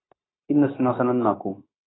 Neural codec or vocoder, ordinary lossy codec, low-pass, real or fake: codec, 16 kHz, 0.9 kbps, LongCat-Audio-Codec; AAC, 16 kbps; 7.2 kHz; fake